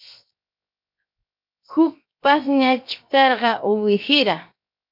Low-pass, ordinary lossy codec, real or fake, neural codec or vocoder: 5.4 kHz; MP3, 48 kbps; fake; codec, 16 kHz, 0.7 kbps, FocalCodec